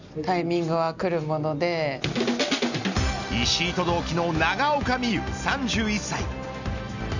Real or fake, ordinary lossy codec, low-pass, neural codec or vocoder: real; none; 7.2 kHz; none